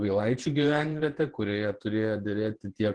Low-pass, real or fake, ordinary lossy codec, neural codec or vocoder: 9.9 kHz; fake; Opus, 16 kbps; vocoder, 48 kHz, 128 mel bands, Vocos